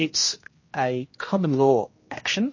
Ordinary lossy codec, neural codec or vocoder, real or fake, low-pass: MP3, 32 kbps; codec, 16 kHz, 1 kbps, X-Codec, HuBERT features, trained on general audio; fake; 7.2 kHz